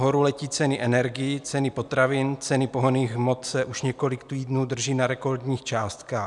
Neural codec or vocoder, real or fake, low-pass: none; real; 10.8 kHz